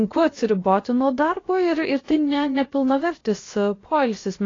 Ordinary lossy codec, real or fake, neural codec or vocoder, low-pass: AAC, 32 kbps; fake; codec, 16 kHz, about 1 kbps, DyCAST, with the encoder's durations; 7.2 kHz